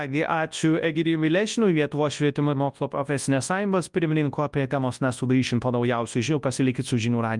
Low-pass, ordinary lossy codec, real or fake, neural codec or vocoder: 10.8 kHz; Opus, 32 kbps; fake; codec, 24 kHz, 0.9 kbps, WavTokenizer, large speech release